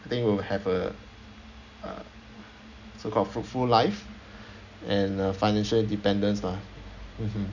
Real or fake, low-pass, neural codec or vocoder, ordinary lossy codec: real; 7.2 kHz; none; none